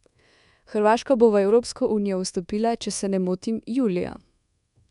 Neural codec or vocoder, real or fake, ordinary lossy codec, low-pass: codec, 24 kHz, 1.2 kbps, DualCodec; fake; MP3, 96 kbps; 10.8 kHz